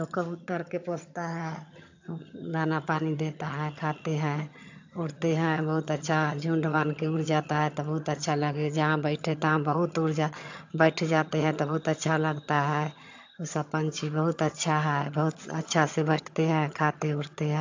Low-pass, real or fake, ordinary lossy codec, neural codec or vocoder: 7.2 kHz; fake; AAC, 48 kbps; vocoder, 22.05 kHz, 80 mel bands, HiFi-GAN